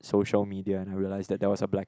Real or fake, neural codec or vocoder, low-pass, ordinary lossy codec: real; none; none; none